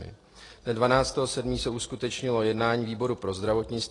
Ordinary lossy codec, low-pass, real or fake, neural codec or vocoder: AAC, 32 kbps; 10.8 kHz; real; none